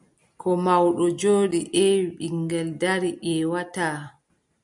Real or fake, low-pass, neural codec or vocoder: real; 10.8 kHz; none